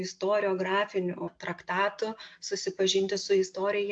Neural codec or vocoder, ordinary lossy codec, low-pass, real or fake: none; AAC, 64 kbps; 9.9 kHz; real